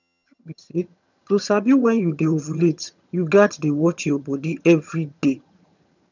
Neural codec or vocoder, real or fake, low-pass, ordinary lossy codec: vocoder, 22.05 kHz, 80 mel bands, HiFi-GAN; fake; 7.2 kHz; none